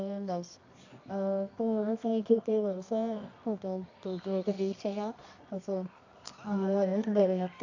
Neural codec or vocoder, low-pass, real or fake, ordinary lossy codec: codec, 24 kHz, 0.9 kbps, WavTokenizer, medium music audio release; 7.2 kHz; fake; AAC, 48 kbps